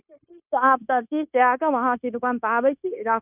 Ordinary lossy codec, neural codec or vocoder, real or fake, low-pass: none; codec, 16 kHz, 0.9 kbps, LongCat-Audio-Codec; fake; 3.6 kHz